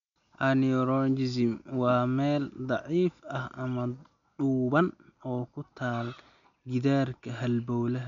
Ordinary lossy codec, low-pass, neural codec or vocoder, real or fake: none; 7.2 kHz; none; real